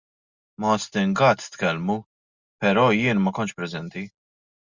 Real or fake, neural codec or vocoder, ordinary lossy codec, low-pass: real; none; Opus, 64 kbps; 7.2 kHz